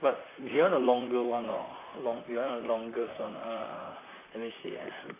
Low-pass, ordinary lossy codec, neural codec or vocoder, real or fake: 3.6 kHz; AAC, 24 kbps; vocoder, 44.1 kHz, 128 mel bands, Pupu-Vocoder; fake